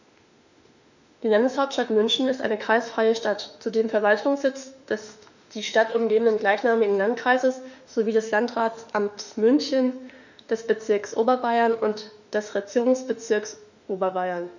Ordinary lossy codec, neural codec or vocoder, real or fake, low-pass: none; autoencoder, 48 kHz, 32 numbers a frame, DAC-VAE, trained on Japanese speech; fake; 7.2 kHz